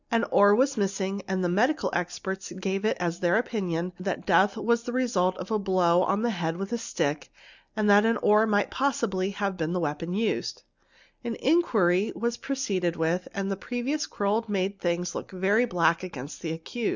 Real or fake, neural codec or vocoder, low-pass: real; none; 7.2 kHz